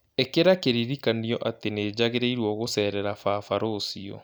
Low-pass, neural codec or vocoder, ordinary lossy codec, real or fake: none; none; none; real